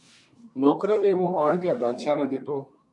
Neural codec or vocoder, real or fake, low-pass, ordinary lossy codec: codec, 24 kHz, 1 kbps, SNAC; fake; 10.8 kHz; MP3, 64 kbps